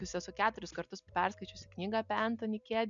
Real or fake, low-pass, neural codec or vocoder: real; 7.2 kHz; none